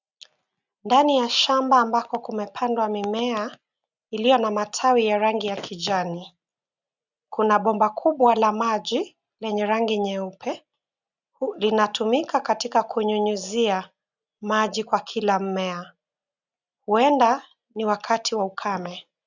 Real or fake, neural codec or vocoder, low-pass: real; none; 7.2 kHz